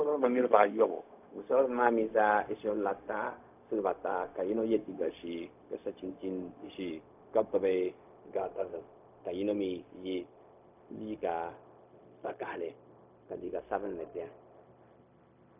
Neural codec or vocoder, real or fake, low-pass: codec, 16 kHz, 0.4 kbps, LongCat-Audio-Codec; fake; 3.6 kHz